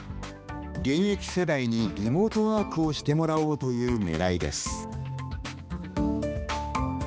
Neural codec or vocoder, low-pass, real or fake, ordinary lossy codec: codec, 16 kHz, 2 kbps, X-Codec, HuBERT features, trained on balanced general audio; none; fake; none